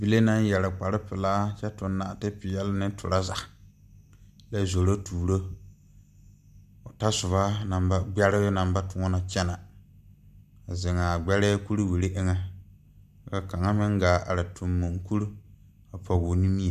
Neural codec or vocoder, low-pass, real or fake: none; 14.4 kHz; real